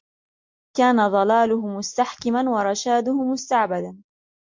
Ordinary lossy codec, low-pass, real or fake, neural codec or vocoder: MP3, 48 kbps; 7.2 kHz; real; none